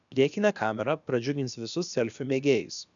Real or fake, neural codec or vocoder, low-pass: fake; codec, 16 kHz, about 1 kbps, DyCAST, with the encoder's durations; 7.2 kHz